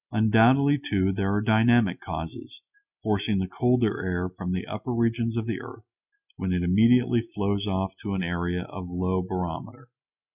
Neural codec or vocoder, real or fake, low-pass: none; real; 3.6 kHz